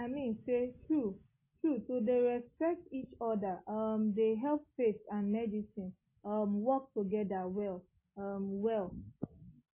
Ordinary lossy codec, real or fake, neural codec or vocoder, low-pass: MP3, 16 kbps; real; none; 3.6 kHz